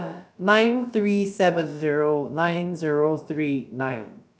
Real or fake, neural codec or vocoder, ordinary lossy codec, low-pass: fake; codec, 16 kHz, about 1 kbps, DyCAST, with the encoder's durations; none; none